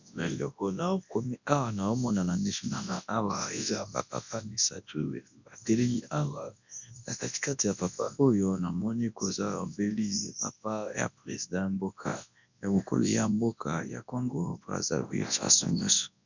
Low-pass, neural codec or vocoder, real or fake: 7.2 kHz; codec, 24 kHz, 0.9 kbps, WavTokenizer, large speech release; fake